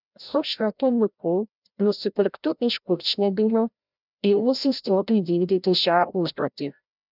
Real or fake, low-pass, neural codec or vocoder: fake; 5.4 kHz; codec, 16 kHz, 0.5 kbps, FreqCodec, larger model